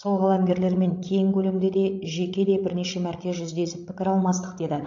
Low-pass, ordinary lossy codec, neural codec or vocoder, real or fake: 7.2 kHz; AAC, 48 kbps; codec, 16 kHz, 16 kbps, FreqCodec, larger model; fake